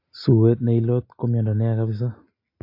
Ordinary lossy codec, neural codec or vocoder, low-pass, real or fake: AAC, 32 kbps; none; 5.4 kHz; real